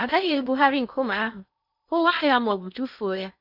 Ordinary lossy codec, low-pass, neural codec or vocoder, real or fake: none; 5.4 kHz; codec, 16 kHz in and 24 kHz out, 0.6 kbps, FocalCodec, streaming, 2048 codes; fake